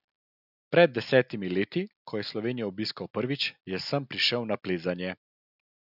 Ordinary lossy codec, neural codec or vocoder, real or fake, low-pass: none; none; real; 5.4 kHz